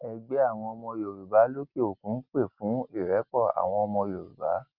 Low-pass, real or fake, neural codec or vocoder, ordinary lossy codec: 5.4 kHz; real; none; Opus, 24 kbps